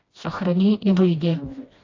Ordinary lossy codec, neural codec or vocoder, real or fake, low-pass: AAC, 32 kbps; codec, 16 kHz, 1 kbps, FreqCodec, smaller model; fake; 7.2 kHz